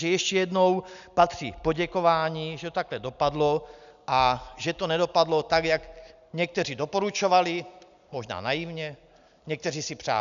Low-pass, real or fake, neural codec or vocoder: 7.2 kHz; real; none